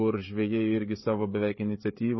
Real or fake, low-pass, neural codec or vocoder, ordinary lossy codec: fake; 7.2 kHz; codec, 16 kHz, 16 kbps, FreqCodec, smaller model; MP3, 24 kbps